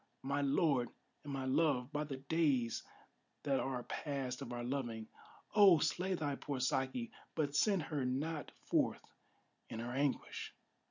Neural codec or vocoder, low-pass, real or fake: none; 7.2 kHz; real